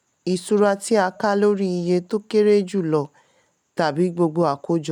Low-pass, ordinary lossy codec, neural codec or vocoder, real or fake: 19.8 kHz; none; none; real